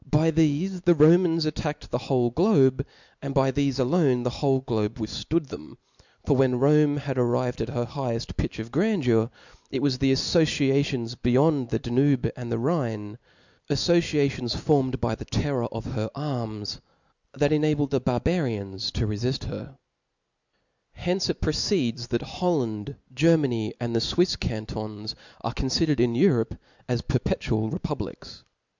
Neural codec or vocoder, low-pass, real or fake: none; 7.2 kHz; real